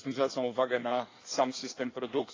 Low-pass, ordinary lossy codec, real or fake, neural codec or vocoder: 7.2 kHz; AAC, 32 kbps; fake; codec, 16 kHz in and 24 kHz out, 1.1 kbps, FireRedTTS-2 codec